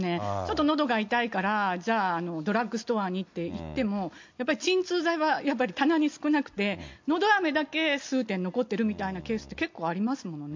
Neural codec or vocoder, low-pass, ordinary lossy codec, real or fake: none; 7.2 kHz; MP3, 48 kbps; real